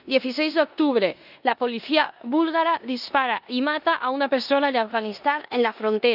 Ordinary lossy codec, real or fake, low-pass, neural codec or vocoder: none; fake; 5.4 kHz; codec, 16 kHz in and 24 kHz out, 0.9 kbps, LongCat-Audio-Codec, four codebook decoder